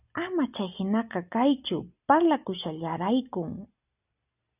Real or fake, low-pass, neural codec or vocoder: real; 3.6 kHz; none